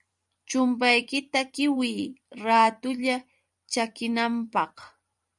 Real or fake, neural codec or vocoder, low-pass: fake; vocoder, 44.1 kHz, 128 mel bands every 256 samples, BigVGAN v2; 10.8 kHz